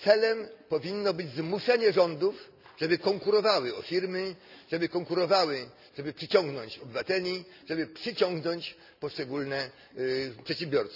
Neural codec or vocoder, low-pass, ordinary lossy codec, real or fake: none; 5.4 kHz; none; real